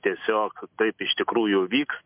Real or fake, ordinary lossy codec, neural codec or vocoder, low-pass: real; MP3, 32 kbps; none; 3.6 kHz